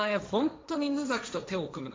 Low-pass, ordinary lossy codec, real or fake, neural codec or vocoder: none; none; fake; codec, 16 kHz, 1.1 kbps, Voila-Tokenizer